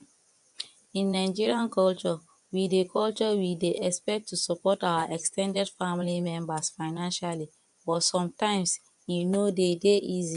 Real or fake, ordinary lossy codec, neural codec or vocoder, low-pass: fake; none; vocoder, 24 kHz, 100 mel bands, Vocos; 10.8 kHz